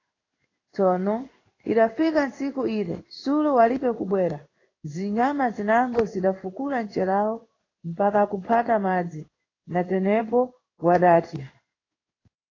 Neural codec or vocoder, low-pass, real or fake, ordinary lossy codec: codec, 16 kHz in and 24 kHz out, 1 kbps, XY-Tokenizer; 7.2 kHz; fake; AAC, 32 kbps